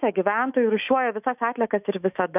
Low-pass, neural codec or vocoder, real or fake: 3.6 kHz; autoencoder, 48 kHz, 128 numbers a frame, DAC-VAE, trained on Japanese speech; fake